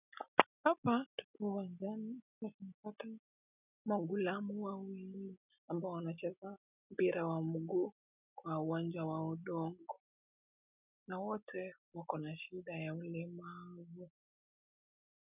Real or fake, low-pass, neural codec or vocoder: real; 3.6 kHz; none